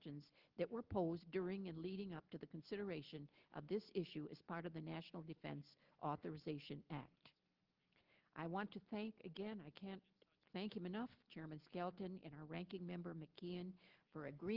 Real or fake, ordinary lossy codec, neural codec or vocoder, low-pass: real; Opus, 16 kbps; none; 5.4 kHz